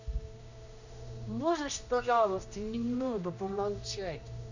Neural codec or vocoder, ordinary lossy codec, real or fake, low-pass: codec, 16 kHz, 1 kbps, X-Codec, HuBERT features, trained on general audio; none; fake; 7.2 kHz